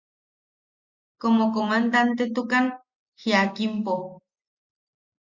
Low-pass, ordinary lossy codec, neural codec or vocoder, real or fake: 7.2 kHz; Opus, 64 kbps; none; real